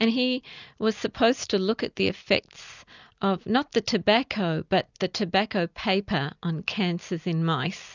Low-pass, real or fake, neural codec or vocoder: 7.2 kHz; real; none